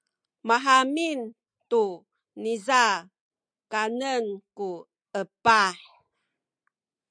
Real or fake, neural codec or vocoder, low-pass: real; none; 9.9 kHz